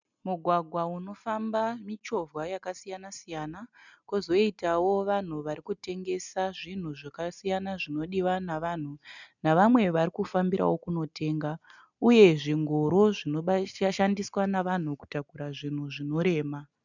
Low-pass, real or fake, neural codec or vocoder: 7.2 kHz; real; none